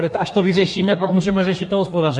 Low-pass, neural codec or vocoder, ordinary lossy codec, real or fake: 10.8 kHz; codec, 24 kHz, 1 kbps, SNAC; MP3, 48 kbps; fake